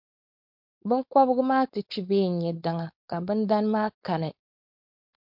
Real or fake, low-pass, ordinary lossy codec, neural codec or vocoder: fake; 5.4 kHz; MP3, 48 kbps; codec, 16 kHz, 4.8 kbps, FACodec